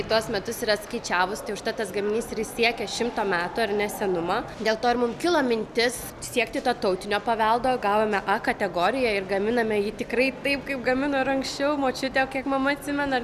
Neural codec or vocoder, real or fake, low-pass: none; real; 14.4 kHz